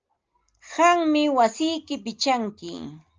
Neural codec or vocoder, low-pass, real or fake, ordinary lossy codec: none; 7.2 kHz; real; Opus, 24 kbps